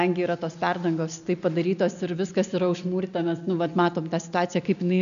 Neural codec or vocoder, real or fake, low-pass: none; real; 7.2 kHz